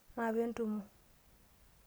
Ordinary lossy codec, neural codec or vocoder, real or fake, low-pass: none; none; real; none